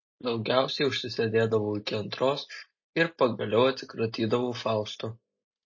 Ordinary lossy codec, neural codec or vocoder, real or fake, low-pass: MP3, 32 kbps; none; real; 7.2 kHz